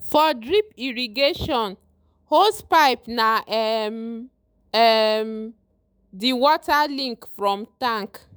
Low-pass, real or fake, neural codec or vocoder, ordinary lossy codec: none; fake; autoencoder, 48 kHz, 128 numbers a frame, DAC-VAE, trained on Japanese speech; none